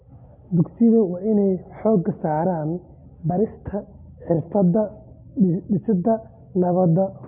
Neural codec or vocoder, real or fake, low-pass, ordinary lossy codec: none; real; 3.6 kHz; none